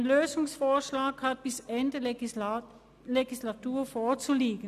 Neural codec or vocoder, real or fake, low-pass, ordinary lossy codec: none; real; 14.4 kHz; none